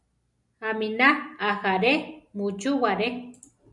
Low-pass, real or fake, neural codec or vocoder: 10.8 kHz; real; none